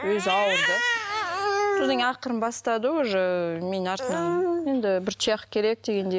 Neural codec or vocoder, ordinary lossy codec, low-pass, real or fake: none; none; none; real